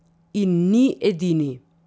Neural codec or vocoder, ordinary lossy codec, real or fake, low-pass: none; none; real; none